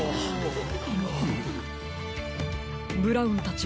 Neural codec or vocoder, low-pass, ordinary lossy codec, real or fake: none; none; none; real